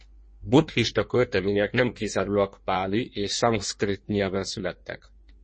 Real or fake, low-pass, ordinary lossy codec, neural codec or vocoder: fake; 9.9 kHz; MP3, 32 kbps; codec, 16 kHz in and 24 kHz out, 1.1 kbps, FireRedTTS-2 codec